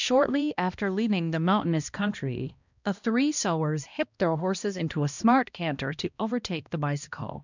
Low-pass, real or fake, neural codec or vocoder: 7.2 kHz; fake; codec, 16 kHz, 1 kbps, X-Codec, HuBERT features, trained on balanced general audio